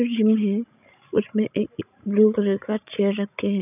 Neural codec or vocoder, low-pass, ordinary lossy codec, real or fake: codec, 16 kHz, 16 kbps, FreqCodec, larger model; 3.6 kHz; none; fake